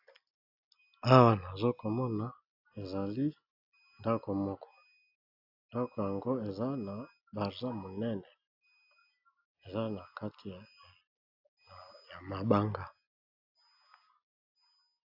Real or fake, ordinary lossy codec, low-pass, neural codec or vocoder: real; AAC, 48 kbps; 5.4 kHz; none